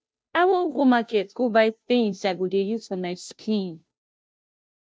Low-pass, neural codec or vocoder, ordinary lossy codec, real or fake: none; codec, 16 kHz, 0.5 kbps, FunCodec, trained on Chinese and English, 25 frames a second; none; fake